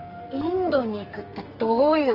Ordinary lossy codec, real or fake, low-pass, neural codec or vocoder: Opus, 24 kbps; fake; 5.4 kHz; codec, 44.1 kHz, 2.6 kbps, SNAC